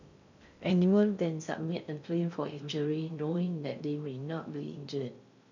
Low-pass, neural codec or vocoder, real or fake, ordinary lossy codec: 7.2 kHz; codec, 16 kHz in and 24 kHz out, 0.6 kbps, FocalCodec, streaming, 2048 codes; fake; none